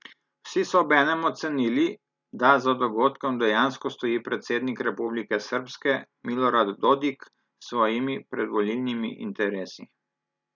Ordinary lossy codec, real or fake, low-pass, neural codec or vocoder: none; real; 7.2 kHz; none